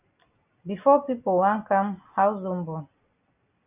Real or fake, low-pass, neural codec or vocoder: real; 3.6 kHz; none